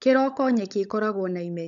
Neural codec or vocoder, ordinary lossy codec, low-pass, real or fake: codec, 16 kHz, 16 kbps, FunCodec, trained on LibriTTS, 50 frames a second; none; 7.2 kHz; fake